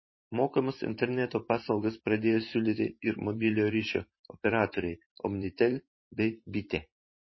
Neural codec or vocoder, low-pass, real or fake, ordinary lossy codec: vocoder, 44.1 kHz, 128 mel bands every 512 samples, BigVGAN v2; 7.2 kHz; fake; MP3, 24 kbps